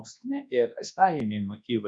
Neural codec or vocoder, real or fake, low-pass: codec, 24 kHz, 0.9 kbps, WavTokenizer, large speech release; fake; 9.9 kHz